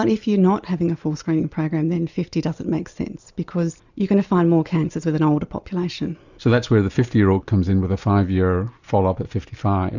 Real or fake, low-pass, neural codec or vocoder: real; 7.2 kHz; none